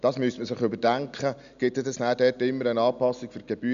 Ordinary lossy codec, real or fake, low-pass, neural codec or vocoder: none; real; 7.2 kHz; none